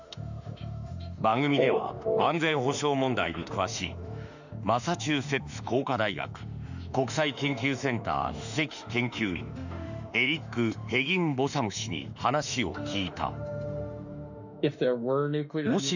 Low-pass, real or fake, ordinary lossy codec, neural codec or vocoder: 7.2 kHz; fake; none; autoencoder, 48 kHz, 32 numbers a frame, DAC-VAE, trained on Japanese speech